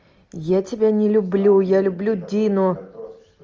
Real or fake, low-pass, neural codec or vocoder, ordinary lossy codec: real; 7.2 kHz; none; Opus, 24 kbps